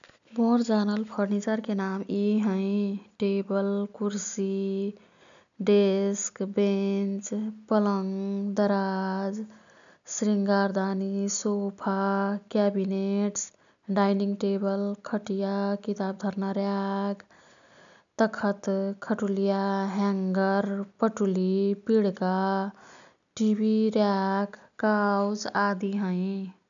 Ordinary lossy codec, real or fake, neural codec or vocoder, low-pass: none; real; none; 7.2 kHz